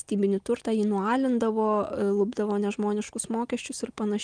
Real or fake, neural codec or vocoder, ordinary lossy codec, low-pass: real; none; Opus, 32 kbps; 9.9 kHz